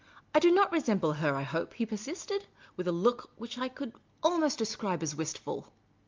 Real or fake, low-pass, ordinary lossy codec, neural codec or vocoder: real; 7.2 kHz; Opus, 24 kbps; none